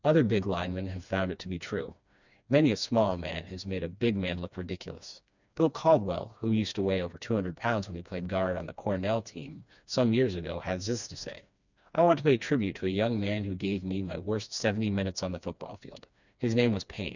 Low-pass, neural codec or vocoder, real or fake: 7.2 kHz; codec, 16 kHz, 2 kbps, FreqCodec, smaller model; fake